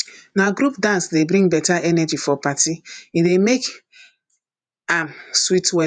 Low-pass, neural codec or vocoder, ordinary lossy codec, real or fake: 9.9 kHz; vocoder, 48 kHz, 128 mel bands, Vocos; none; fake